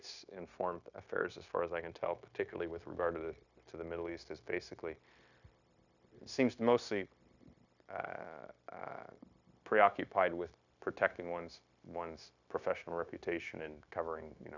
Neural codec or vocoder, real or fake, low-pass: codec, 16 kHz, 0.9 kbps, LongCat-Audio-Codec; fake; 7.2 kHz